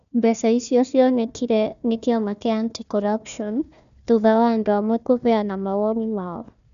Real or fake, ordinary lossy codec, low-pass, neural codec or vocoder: fake; none; 7.2 kHz; codec, 16 kHz, 1 kbps, FunCodec, trained on Chinese and English, 50 frames a second